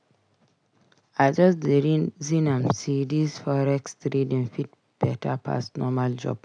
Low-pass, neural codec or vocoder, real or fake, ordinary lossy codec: 9.9 kHz; none; real; none